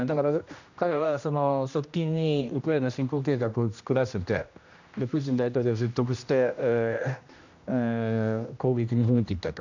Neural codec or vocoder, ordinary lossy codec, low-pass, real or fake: codec, 16 kHz, 1 kbps, X-Codec, HuBERT features, trained on general audio; none; 7.2 kHz; fake